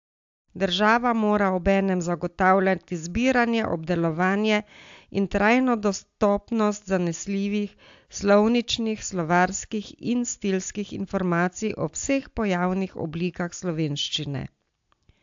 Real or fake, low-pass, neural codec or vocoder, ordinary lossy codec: real; 7.2 kHz; none; AAC, 64 kbps